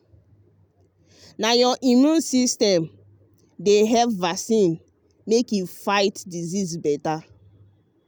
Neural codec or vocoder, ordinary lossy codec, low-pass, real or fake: none; none; none; real